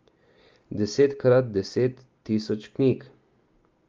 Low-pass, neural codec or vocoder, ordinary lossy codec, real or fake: 7.2 kHz; none; Opus, 32 kbps; real